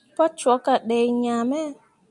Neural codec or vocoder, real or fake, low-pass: none; real; 10.8 kHz